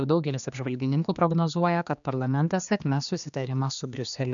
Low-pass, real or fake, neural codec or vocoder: 7.2 kHz; fake; codec, 16 kHz, 2 kbps, X-Codec, HuBERT features, trained on general audio